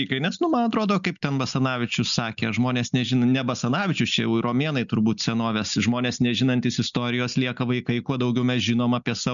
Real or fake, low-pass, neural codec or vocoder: real; 7.2 kHz; none